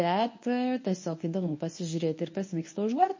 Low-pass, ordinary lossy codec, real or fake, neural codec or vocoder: 7.2 kHz; MP3, 32 kbps; fake; codec, 24 kHz, 0.9 kbps, WavTokenizer, medium speech release version 1